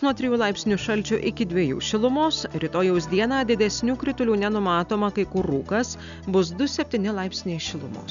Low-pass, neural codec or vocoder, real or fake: 7.2 kHz; none; real